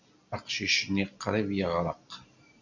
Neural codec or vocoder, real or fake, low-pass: none; real; 7.2 kHz